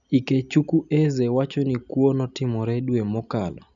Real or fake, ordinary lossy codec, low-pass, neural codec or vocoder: real; none; 7.2 kHz; none